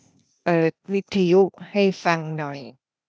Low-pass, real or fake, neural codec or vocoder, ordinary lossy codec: none; fake; codec, 16 kHz, 0.8 kbps, ZipCodec; none